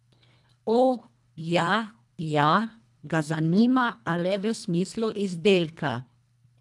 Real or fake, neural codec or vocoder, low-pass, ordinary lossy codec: fake; codec, 24 kHz, 1.5 kbps, HILCodec; none; none